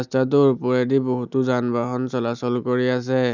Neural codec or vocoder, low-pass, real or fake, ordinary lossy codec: none; 7.2 kHz; real; none